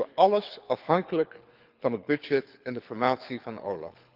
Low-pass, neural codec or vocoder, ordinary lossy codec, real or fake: 5.4 kHz; codec, 24 kHz, 6 kbps, HILCodec; Opus, 32 kbps; fake